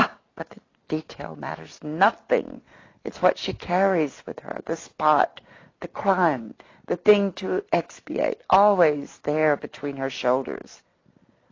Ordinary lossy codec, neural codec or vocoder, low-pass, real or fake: AAC, 32 kbps; none; 7.2 kHz; real